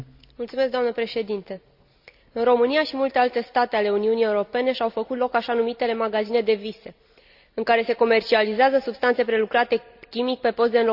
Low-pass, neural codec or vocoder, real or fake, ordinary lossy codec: 5.4 kHz; none; real; none